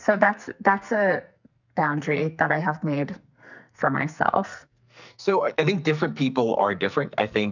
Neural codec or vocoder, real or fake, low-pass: codec, 44.1 kHz, 2.6 kbps, SNAC; fake; 7.2 kHz